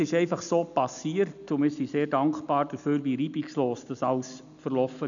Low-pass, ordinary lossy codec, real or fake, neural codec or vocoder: 7.2 kHz; none; real; none